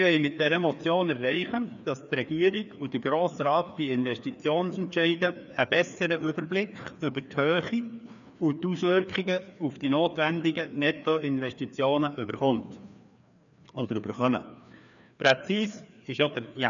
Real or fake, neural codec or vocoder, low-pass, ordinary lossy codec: fake; codec, 16 kHz, 2 kbps, FreqCodec, larger model; 7.2 kHz; none